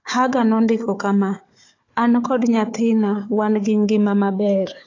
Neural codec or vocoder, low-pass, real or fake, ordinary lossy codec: codec, 16 kHz, 4 kbps, FunCodec, trained on Chinese and English, 50 frames a second; 7.2 kHz; fake; AAC, 32 kbps